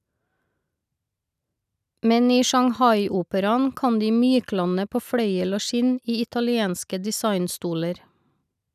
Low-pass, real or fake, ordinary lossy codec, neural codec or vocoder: 14.4 kHz; real; none; none